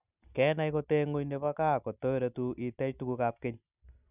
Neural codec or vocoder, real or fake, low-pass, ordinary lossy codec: none; real; 3.6 kHz; none